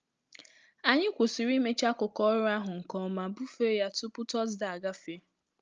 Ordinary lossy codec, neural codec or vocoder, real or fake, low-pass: Opus, 24 kbps; none; real; 7.2 kHz